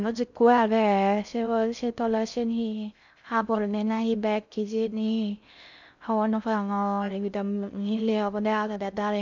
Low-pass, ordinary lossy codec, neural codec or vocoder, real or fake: 7.2 kHz; none; codec, 16 kHz in and 24 kHz out, 0.6 kbps, FocalCodec, streaming, 2048 codes; fake